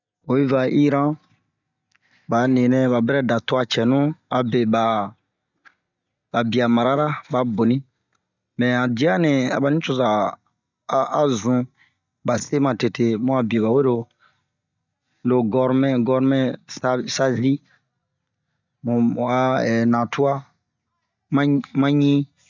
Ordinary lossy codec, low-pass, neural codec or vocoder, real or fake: none; 7.2 kHz; none; real